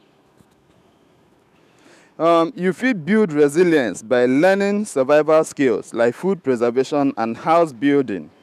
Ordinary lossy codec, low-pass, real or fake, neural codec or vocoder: none; 14.4 kHz; fake; autoencoder, 48 kHz, 128 numbers a frame, DAC-VAE, trained on Japanese speech